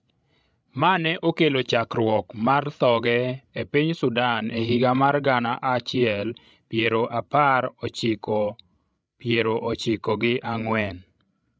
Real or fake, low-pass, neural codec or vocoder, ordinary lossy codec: fake; none; codec, 16 kHz, 16 kbps, FreqCodec, larger model; none